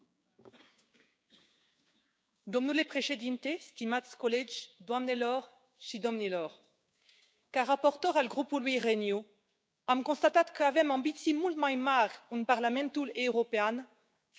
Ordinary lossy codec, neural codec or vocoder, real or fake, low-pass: none; codec, 16 kHz, 6 kbps, DAC; fake; none